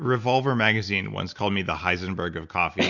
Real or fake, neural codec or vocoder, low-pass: real; none; 7.2 kHz